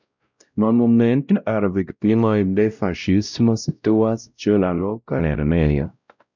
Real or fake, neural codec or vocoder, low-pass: fake; codec, 16 kHz, 0.5 kbps, X-Codec, WavLM features, trained on Multilingual LibriSpeech; 7.2 kHz